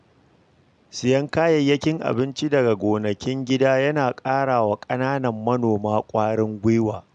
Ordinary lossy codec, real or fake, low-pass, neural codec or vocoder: none; real; 9.9 kHz; none